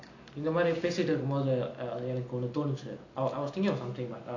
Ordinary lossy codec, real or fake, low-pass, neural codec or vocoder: none; real; 7.2 kHz; none